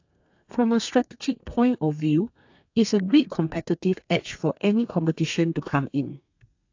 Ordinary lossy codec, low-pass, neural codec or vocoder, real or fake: AAC, 48 kbps; 7.2 kHz; codec, 32 kHz, 1.9 kbps, SNAC; fake